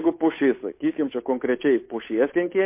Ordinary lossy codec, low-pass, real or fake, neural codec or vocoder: MP3, 24 kbps; 3.6 kHz; fake; codec, 16 kHz, 8 kbps, FunCodec, trained on Chinese and English, 25 frames a second